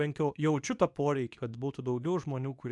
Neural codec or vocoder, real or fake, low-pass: codec, 24 kHz, 0.9 kbps, WavTokenizer, medium speech release version 2; fake; 10.8 kHz